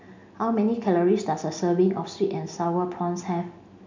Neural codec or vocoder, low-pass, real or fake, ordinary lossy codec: none; 7.2 kHz; real; MP3, 64 kbps